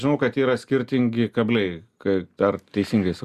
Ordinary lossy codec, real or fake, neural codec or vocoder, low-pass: Opus, 64 kbps; real; none; 14.4 kHz